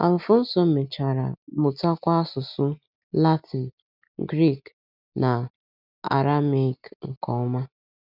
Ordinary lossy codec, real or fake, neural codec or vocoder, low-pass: none; real; none; 5.4 kHz